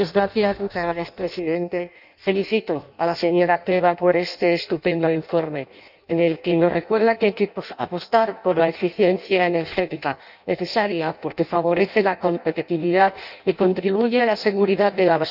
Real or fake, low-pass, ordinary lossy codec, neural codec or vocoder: fake; 5.4 kHz; none; codec, 16 kHz in and 24 kHz out, 0.6 kbps, FireRedTTS-2 codec